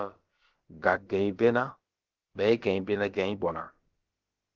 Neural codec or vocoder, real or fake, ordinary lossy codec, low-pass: codec, 16 kHz, about 1 kbps, DyCAST, with the encoder's durations; fake; Opus, 16 kbps; 7.2 kHz